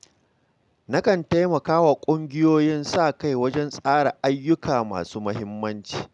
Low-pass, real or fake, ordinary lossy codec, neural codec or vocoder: 10.8 kHz; real; none; none